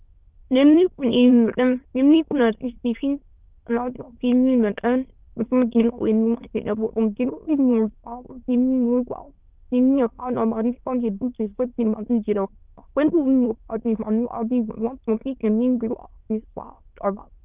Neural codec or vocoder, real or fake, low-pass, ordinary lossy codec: autoencoder, 22.05 kHz, a latent of 192 numbers a frame, VITS, trained on many speakers; fake; 3.6 kHz; Opus, 32 kbps